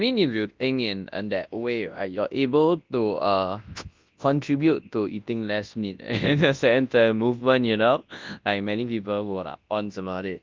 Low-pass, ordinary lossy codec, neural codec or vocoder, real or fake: 7.2 kHz; Opus, 24 kbps; codec, 24 kHz, 0.9 kbps, WavTokenizer, large speech release; fake